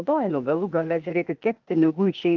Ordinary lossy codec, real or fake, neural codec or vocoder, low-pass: Opus, 32 kbps; fake; codec, 16 kHz, 0.8 kbps, ZipCodec; 7.2 kHz